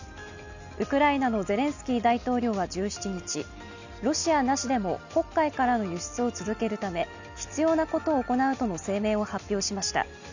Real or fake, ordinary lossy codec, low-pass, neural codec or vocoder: real; none; 7.2 kHz; none